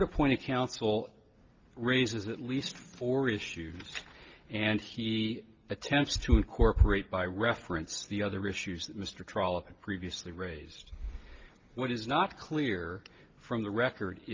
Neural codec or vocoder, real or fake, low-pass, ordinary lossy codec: none; real; 7.2 kHz; Opus, 32 kbps